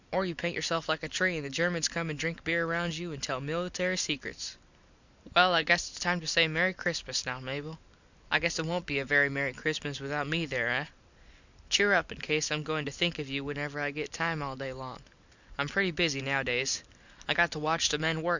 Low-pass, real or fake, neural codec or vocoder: 7.2 kHz; real; none